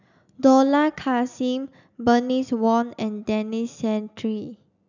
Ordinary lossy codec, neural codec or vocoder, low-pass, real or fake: none; none; 7.2 kHz; real